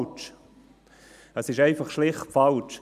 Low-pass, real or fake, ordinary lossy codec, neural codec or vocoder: 14.4 kHz; real; none; none